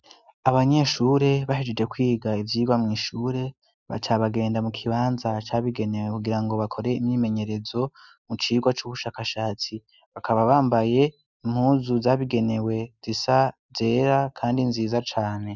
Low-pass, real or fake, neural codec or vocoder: 7.2 kHz; real; none